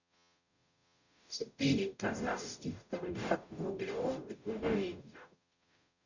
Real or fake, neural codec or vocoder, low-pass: fake; codec, 44.1 kHz, 0.9 kbps, DAC; 7.2 kHz